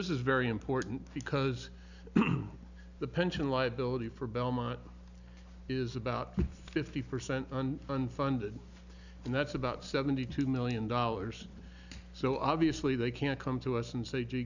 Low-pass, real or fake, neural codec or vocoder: 7.2 kHz; real; none